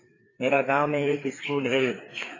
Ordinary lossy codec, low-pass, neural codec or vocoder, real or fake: AAC, 32 kbps; 7.2 kHz; codec, 16 kHz, 4 kbps, FreqCodec, larger model; fake